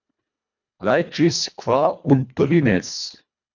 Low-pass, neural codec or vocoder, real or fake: 7.2 kHz; codec, 24 kHz, 1.5 kbps, HILCodec; fake